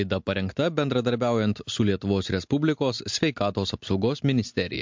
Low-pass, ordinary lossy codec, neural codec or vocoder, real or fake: 7.2 kHz; MP3, 64 kbps; none; real